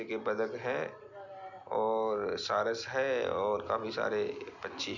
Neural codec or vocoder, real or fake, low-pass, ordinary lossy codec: none; real; 7.2 kHz; none